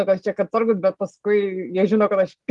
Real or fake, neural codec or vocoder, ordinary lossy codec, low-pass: real; none; Opus, 24 kbps; 10.8 kHz